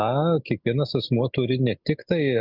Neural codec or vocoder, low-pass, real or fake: none; 5.4 kHz; real